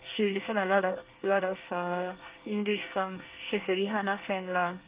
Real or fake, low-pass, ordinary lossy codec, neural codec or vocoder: fake; 3.6 kHz; Opus, 32 kbps; codec, 24 kHz, 1 kbps, SNAC